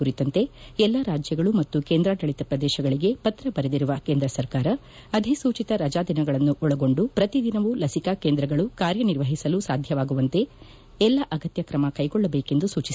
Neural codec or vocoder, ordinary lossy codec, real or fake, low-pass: none; none; real; none